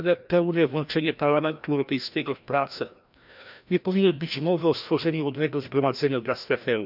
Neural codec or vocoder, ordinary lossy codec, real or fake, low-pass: codec, 16 kHz, 1 kbps, FreqCodec, larger model; none; fake; 5.4 kHz